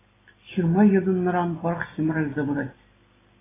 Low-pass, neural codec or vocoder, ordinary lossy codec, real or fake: 3.6 kHz; none; AAC, 16 kbps; real